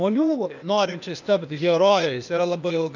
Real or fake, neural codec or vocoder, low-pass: fake; codec, 16 kHz, 0.8 kbps, ZipCodec; 7.2 kHz